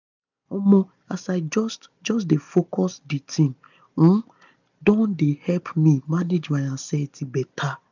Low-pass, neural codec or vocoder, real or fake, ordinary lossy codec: 7.2 kHz; none; real; none